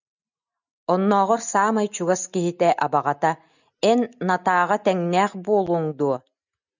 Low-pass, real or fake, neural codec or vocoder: 7.2 kHz; real; none